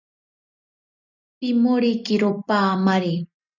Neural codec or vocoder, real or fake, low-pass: none; real; 7.2 kHz